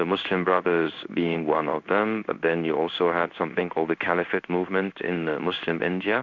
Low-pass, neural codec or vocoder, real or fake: 7.2 kHz; codec, 16 kHz in and 24 kHz out, 1 kbps, XY-Tokenizer; fake